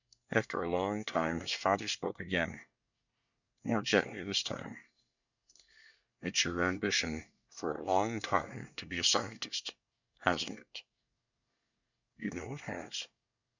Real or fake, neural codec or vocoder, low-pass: fake; codec, 24 kHz, 1 kbps, SNAC; 7.2 kHz